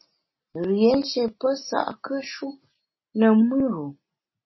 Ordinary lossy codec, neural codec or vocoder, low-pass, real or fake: MP3, 24 kbps; none; 7.2 kHz; real